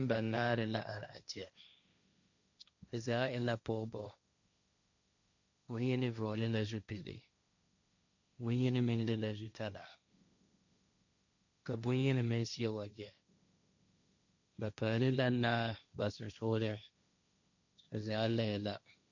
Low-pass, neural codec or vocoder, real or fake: 7.2 kHz; codec, 16 kHz, 1.1 kbps, Voila-Tokenizer; fake